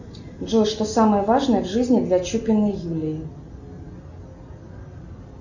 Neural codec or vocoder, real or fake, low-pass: none; real; 7.2 kHz